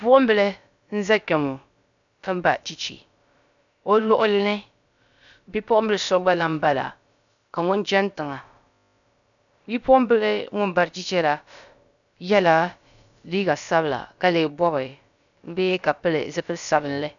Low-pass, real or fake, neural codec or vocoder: 7.2 kHz; fake; codec, 16 kHz, about 1 kbps, DyCAST, with the encoder's durations